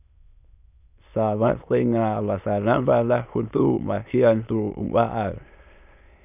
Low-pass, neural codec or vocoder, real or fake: 3.6 kHz; autoencoder, 22.05 kHz, a latent of 192 numbers a frame, VITS, trained on many speakers; fake